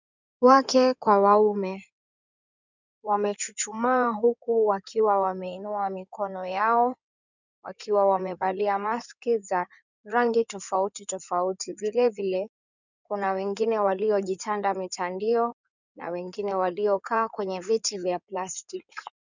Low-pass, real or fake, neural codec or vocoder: 7.2 kHz; fake; codec, 16 kHz in and 24 kHz out, 2.2 kbps, FireRedTTS-2 codec